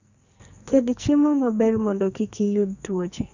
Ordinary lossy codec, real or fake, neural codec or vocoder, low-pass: none; fake; codec, 44.1 kHz, 2.6 kbps, SNAC; 7.2 kHz